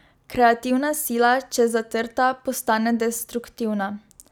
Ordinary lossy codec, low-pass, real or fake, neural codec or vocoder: none; none; real; none